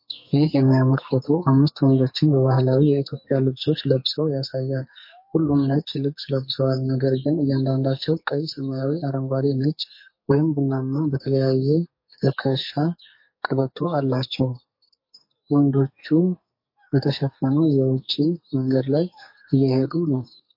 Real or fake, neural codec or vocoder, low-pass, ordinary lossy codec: fake; codec, 44.1 kHz, 2.6 kbps, SNAC; 5.4 kHz; MP3, 32 kbps